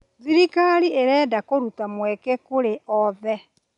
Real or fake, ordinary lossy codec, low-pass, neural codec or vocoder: real; none; 10.8 kHz; none